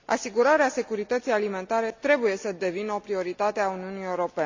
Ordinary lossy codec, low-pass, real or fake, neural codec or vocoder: MP3, 64 kbps; 7.2 kHz; real; none